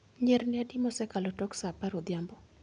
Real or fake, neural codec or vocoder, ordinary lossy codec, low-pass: real; none; MP3, 96 kbps; 9.9 kHz